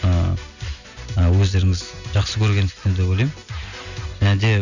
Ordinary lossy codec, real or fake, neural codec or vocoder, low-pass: none; real; none; 7.2 kHz